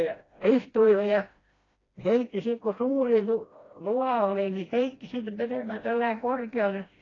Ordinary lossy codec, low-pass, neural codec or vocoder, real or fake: AAC, 32 kbps; 7.2 kHz; codec, 16 kHz, 1 kbps, FreqCodec, smaller model; fake